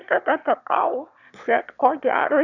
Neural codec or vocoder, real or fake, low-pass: autoencoder, 22.05 kHz, a latent of 192 numbers a frame, VITS, trained on one speaker; fake; 7.2 kHz